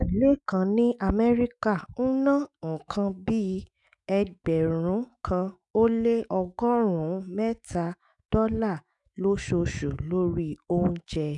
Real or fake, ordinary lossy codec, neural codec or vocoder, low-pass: fake; none; autoencoder, 48 kHz, 128 numbers a frame, DAC-VAE, trained on Japanese speech; 10.8 kHz